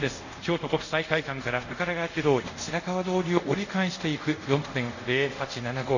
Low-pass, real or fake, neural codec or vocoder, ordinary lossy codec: 7.2 kHz; fake; codec, 24 kHz, 0.5 kbps, DualCodec; none